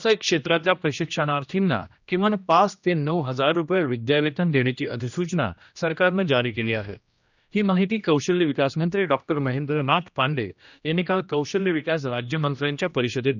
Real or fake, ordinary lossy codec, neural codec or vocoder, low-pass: fake; none; codec, 16 kHz, 1 kbps, X-Codec, HuBERT features, trained on general audio; 7.2 kHz